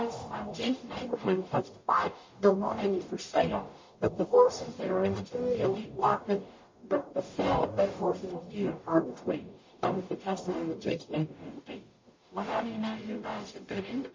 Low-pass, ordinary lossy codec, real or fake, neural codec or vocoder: 7.2 kHz; MP3, 32 kbps; fake; codec, 44.1 kHz, 0.9 kbps, DAC